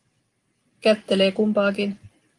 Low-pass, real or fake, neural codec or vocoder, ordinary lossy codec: 10.8 kHz; real; none; Opus, 24 kbps